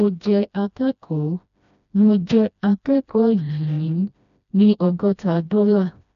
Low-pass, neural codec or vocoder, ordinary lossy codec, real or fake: 7.2 kHz; codec, 16 kHz, 1 kbps, FreqCodec, smaller model; none; fake